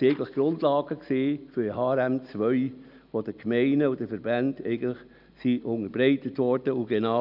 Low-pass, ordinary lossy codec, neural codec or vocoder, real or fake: 5.4 kHz; none; none; real